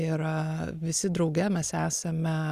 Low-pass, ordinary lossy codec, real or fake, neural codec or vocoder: 14.4 kHz; Opus, 64 kbps; real; none